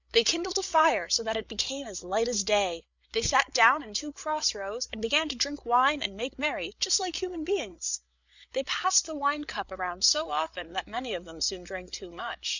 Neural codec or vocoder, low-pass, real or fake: codec, 16 kHz, 8 kbps, FreqCodec, larger model; 7.2 kHz; fake